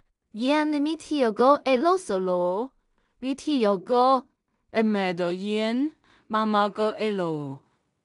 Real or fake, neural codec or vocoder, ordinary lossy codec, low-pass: fake; codec, 16 kHz in and 24 kHz out, 0.4 kbps, LongCat-Audio-Codec, two codebook decoder; none; 10.8 kHz